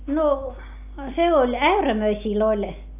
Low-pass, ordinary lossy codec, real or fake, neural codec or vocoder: 3.6 kHz; none; real; none